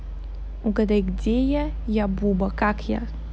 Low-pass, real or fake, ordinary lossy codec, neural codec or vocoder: none; real; none; none